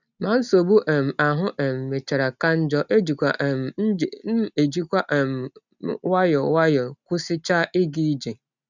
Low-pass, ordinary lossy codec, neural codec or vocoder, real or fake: 7.2 kHz; none; none; real